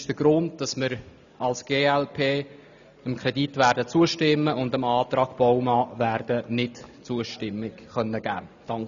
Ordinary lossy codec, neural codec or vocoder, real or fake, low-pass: none; none; real; 7.2 kHz